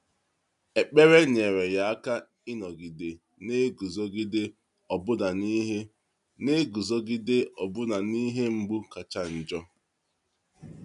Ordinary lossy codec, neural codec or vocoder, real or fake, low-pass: none; none; real; 10.8 kHz